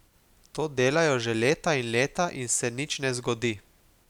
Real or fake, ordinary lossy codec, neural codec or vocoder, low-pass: real; none; none; 19.8 kHz